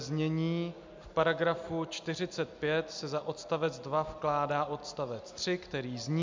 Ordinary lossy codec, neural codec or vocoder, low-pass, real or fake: MP3, 64 kbps; none; 7.2 kHz; real